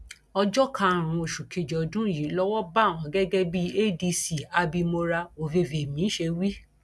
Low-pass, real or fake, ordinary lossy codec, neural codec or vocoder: none; real; none; none